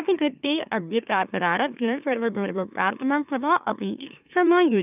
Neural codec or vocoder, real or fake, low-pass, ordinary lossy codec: autoencoder, 44.1 kHz, a latent of 192 numbers a frame, MeloTTS; fake; 3.6 kHz; none